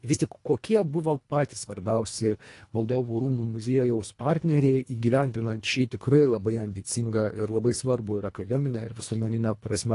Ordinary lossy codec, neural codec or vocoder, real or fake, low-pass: AAC, 48 kbps; codec, 24 kHz, 1.5 kbps, HILCodec; fake; 10.8 kHz